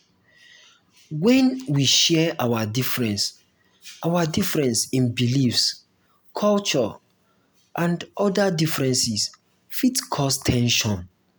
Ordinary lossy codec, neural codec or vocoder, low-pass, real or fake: none; none; none; real